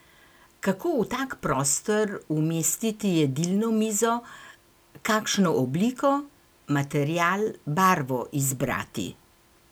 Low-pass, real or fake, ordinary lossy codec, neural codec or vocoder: none; real; none; none